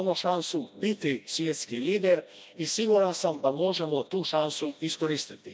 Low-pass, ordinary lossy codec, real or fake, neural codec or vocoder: none; none; fake; codec, 16 kHz, 1 kbps, FreqCodec, smaller model